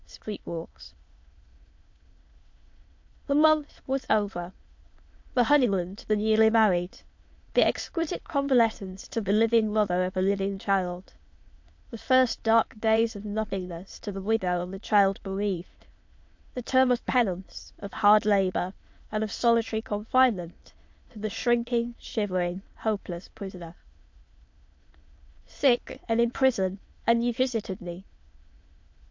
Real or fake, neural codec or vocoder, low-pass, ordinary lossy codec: fake; autoencoder, 22.05 kHz, a latent of 192 numbers a frame, VITS, trained on many speakers; 7.2 kHz; MP3, 48 kbps